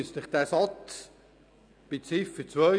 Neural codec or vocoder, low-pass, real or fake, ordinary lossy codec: none; 9.9 kHz; real; none